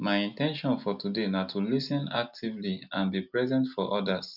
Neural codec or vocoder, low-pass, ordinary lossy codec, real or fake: none; 5.4 kHz; none; real